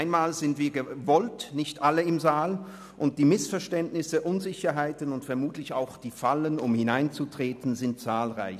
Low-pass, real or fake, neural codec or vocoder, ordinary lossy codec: 14.4 kHz; real; none; none